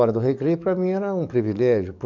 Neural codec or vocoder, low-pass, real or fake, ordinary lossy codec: codec, 44.1 kHz, 7.8 kbps, DAC; 7.2 kHz; fake; none